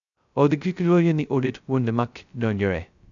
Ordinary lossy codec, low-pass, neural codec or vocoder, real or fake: none; 7.2 kHz; codec, 16 kHz, 0.2 kbps, FocalCodec; fake